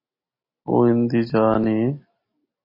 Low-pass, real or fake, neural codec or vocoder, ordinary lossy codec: 5.4 kHz; real; none; MP3, 24 kbps